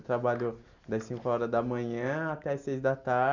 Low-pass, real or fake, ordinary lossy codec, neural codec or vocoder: 7.2 kHz; real; none; none